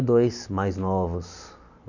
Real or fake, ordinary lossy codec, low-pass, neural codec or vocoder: real; none; 7.2 kHz; none